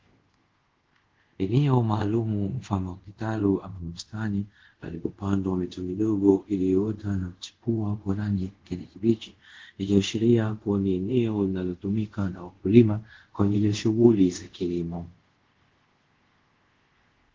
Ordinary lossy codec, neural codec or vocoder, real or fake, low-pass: Opus, 16 kbps; codec, 24 kHz, 0.5 kbps, DualCodec; fake; 7.2 kHz